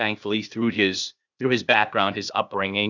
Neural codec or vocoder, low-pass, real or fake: codec, 16 kHz, 0.8 kbps, ZipCodec; 7.2 kHz; fake